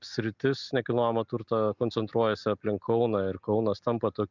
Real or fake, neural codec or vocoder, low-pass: real; none; 7.2 kHz